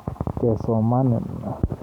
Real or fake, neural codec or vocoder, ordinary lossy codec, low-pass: fake; vocoder, 44.1 kHz, 128 mel bands every 512 samples, BigVGAN v2; none; 19.8 kHz